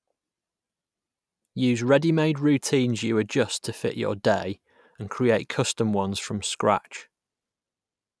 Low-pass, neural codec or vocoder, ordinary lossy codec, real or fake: none; none; none; real